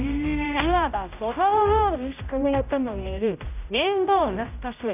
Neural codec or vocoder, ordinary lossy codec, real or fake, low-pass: codec, 16 kHz, 0.5 kbps, X-Codec, HuBERT features, trained on general audio; none; fake; 3.6 kHz